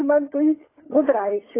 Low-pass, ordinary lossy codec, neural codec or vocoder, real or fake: 3.6 kHz; AAC, 16 kbps; codec, 16 kHz, 16 kbps, FunCodec, trained on LibriTTS, 50 frames a second; fake